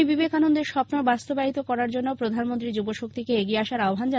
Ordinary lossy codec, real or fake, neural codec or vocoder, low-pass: none; real; none; none